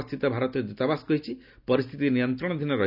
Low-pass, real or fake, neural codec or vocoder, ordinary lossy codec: 5.4 kHz; real; none; none